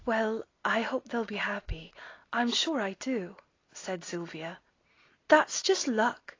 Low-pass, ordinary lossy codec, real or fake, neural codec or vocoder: 7.2 kHz; AAC, 32 kbps; real; none